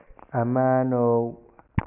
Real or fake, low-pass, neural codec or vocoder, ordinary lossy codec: real; 3.6 kHz; none; none